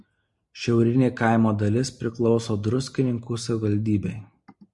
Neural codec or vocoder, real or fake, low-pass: none; real; 10.8 kHz